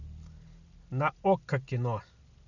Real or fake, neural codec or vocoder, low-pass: real; none; 7.2 kHz